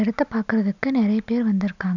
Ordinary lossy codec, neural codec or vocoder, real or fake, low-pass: none; none; real; 7.2 kHz